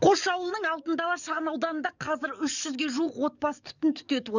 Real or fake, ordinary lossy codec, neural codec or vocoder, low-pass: fake; none; codec, 44.1 kHz, 7.8 kbps, DAC; 7.2 kHz